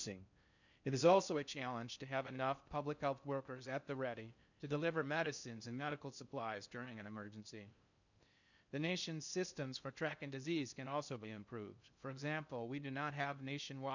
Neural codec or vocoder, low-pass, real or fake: codec, 16 kHz in and 24 kHz out, 0.6 kbps, FocalCodec, streaming, 4096 codes; 7.2 kHz; fake